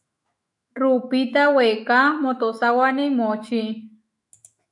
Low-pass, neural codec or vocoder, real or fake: 10.8 kHz; autoencoder, 48 kHz, 128 numbers a frame, DAC-VAE, trained on Japanese speech; fake